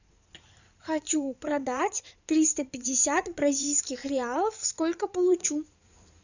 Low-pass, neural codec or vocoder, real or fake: 7.2 kHz; codec, 16 kHz in and 24 kHz out, 2.2 kbps, FireRedTTS-2 codec; fake